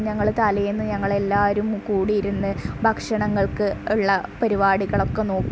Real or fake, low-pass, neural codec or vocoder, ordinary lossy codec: real; none; none; none